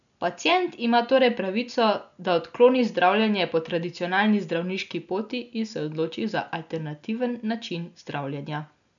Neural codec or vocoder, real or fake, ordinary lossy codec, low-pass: none; real; none; 7.2 kHz